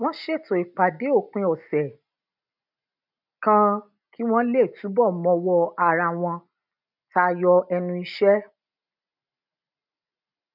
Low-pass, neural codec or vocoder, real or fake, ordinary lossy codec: 5.4 kHz; none; real; none